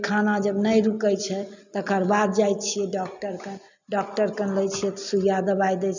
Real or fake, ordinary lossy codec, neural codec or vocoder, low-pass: real; none; none; 7.2 kHz